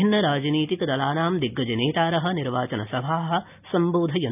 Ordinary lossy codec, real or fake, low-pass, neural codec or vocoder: none; real; 3.6 kHz; none